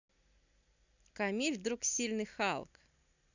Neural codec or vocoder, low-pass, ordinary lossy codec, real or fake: none; 7.2 kHz; none; real